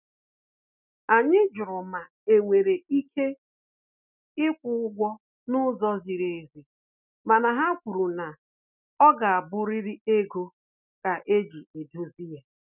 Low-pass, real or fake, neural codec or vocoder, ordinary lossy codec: 3.6 kHz; real; none; none